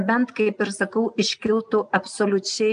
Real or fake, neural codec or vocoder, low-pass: real; none; 9.9 kHz